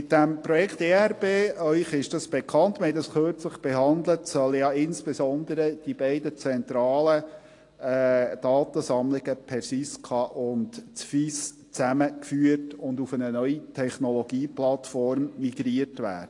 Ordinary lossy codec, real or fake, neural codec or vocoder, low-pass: AAC, 48 kbps; real; none; 10.8 kHz